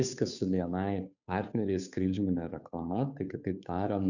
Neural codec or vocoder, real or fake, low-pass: codec, 16 kHz, 2 kbps, FunCodec, trained on Chinese and English, 25 frames a second; fake; 7.2 kHz